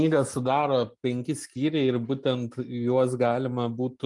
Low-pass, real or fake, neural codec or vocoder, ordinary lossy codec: 10.8 kHz; real; none; Opus, 32 kbps